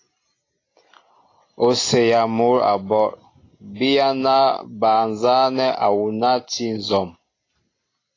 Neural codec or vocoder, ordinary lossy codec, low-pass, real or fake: none; AAC, 32 kbps; 7.2 kHz; real